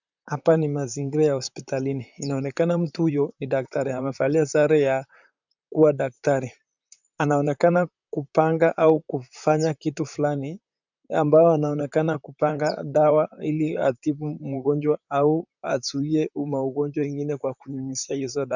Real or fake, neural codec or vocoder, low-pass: fake; vocoder, 44.1 kHz, 128 mel bands, Pupu-Vocoder; 7.2 kHz